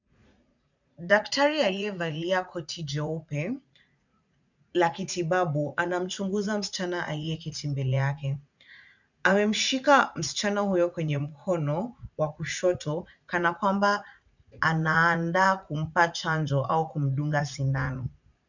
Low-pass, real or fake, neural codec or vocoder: 7.2 kHz; fake; vocoder, 44.1 kHz, 80 mel bands, Vocos